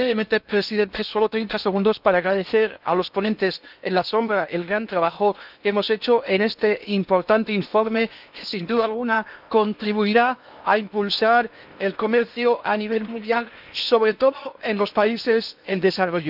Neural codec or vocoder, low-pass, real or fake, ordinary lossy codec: codec, 16 kHz in and 24 kHz out, 0.8 kbps, FocalCodec, streaming, 65536 codes; 5.4 kHz; fake; none